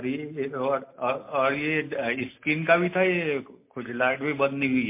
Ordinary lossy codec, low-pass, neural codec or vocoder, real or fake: MP3, 24 kbps; 3.6 kHz; none; real